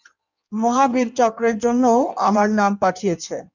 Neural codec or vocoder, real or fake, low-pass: codec, 16 kHz in and 24 kHz out, 1.1 kbps, FireRedTTS-2 codec; fake; 7.2 kHz